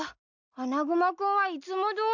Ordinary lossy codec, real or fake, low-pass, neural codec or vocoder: none; real; 7.2 kHz; none